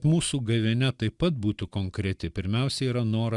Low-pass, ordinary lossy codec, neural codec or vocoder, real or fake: 10.8 kHz; Opus, 64 kbps; none; real